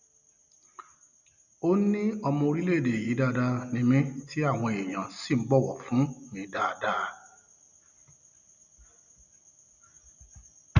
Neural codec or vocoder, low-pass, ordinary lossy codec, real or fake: none; 7.2 kHz; none; real